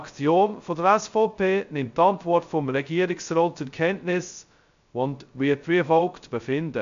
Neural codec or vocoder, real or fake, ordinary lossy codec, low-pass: codec, 16 kHz, 0.2 kbps, FocalCodec; fake; MP3, 64 kbps; 7.2 kHz